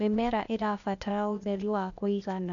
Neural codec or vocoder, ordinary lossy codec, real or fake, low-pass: codec, 16 kHz, 0.8 kbps, ZipCodec; none; fake; 7.2 kHz